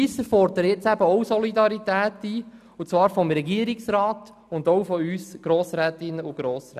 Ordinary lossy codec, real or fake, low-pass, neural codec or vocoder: none; real; 14.4 kHz; none